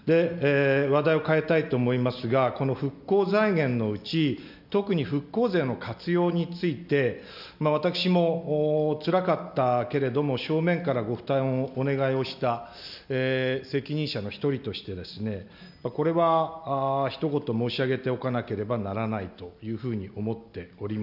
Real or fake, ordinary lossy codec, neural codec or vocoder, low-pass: real; none; none; 5.4 kHz